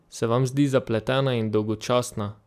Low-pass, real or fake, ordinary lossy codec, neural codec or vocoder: 14.4 kHz; real; AAC, 96 kbps; none